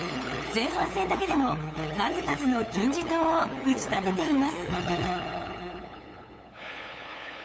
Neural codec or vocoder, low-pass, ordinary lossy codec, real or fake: codec, 16 kHz, 8 kbps, FunCodec, trained on LibriTTS, 25 frames a second; none; none; fake